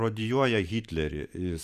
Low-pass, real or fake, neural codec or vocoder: 14.4 kHz; fake; vocoder, 44.1 kHz, 128 mel bands every 512 samples, BigVGAN v2